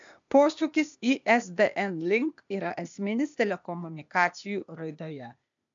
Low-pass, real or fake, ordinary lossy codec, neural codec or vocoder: 7.2 kHz; fake; MP3, 64 kbps; codec, 16 kHz, 0.8 kbps, ZipCodec